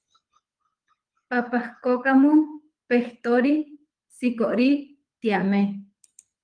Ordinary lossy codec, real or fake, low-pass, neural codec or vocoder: Opus, 32 kbps; fake; 9.9 kHz; codec, 24 kHz, 6 kbps, HILCodec